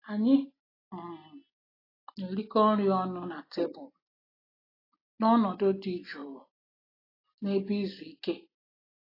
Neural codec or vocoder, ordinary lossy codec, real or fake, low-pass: none; AAC, 24 kbps; real; 5.4 kHz